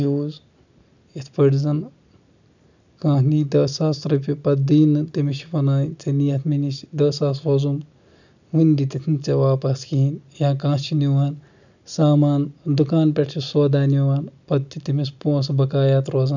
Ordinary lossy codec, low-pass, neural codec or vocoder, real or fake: none; 7.2 kHz; none; real